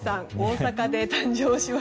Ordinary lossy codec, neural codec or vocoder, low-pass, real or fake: none; none; none; real